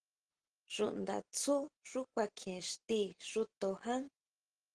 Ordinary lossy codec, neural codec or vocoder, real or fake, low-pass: Opus, 16 kbps; none; real; 9.9 kHz